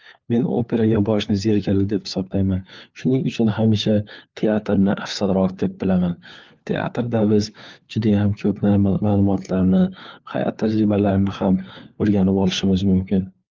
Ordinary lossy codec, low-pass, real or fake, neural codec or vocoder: Opus, 24 kbps; 7.2 kHz; fake; codec, 16 kHz, 4 kbps, FunCodec, trained on LibriTTS, 50 frames a second